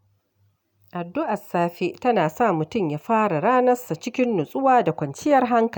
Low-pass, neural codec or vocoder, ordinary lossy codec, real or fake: 19.8 kHz; none; none; real